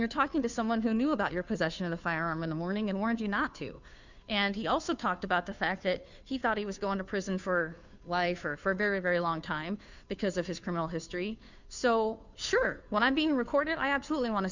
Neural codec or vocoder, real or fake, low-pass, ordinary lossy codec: codec, 16 kHz, 2 kbps, FunCodec, trained on Chinese and English, 25 frames a second; fake; 7.2 kHz; Opus, 64 kbps